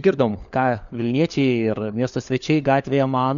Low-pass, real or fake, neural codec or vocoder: 7.2 kHz; fake; codec, 16 kHz, 4 kbps, FunCodec, trained on LibriTTS, 50 frames a second